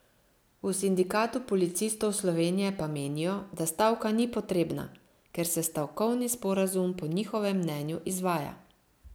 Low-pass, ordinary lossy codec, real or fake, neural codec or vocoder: none; none; real; none